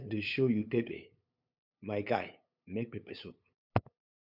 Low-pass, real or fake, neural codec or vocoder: 5.4 kHz; fake; codec, 16 kHz, 8 kbps, FunCodec, trained on LibriTTS, 25 frames a second